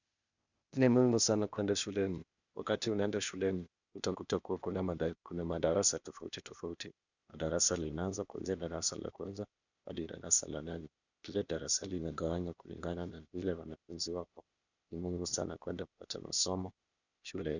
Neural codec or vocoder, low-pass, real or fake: codec, 16 kHz, 0.8 kbps, ZipCodec; 7.2 kHz; fake